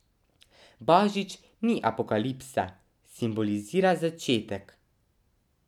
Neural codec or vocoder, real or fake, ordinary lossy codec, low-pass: none; real; none; 19.8 kHz